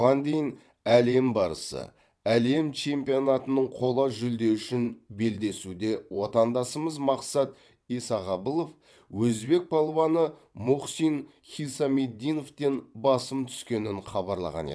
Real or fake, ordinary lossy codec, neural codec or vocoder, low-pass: fake; none; vocoder, 22.05 kHz, 80 mel bands, WaveNeXt; none